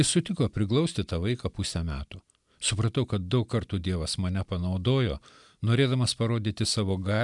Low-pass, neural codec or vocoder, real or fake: 10.8 kHz; none; real